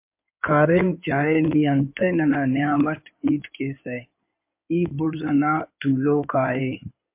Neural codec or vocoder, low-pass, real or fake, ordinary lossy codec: codec, 16 kHz in and 24 kHz out, 2.2 kbps, FireRedTTS-2 codec; 3.6 kHz; fake; MP3, 32 kbps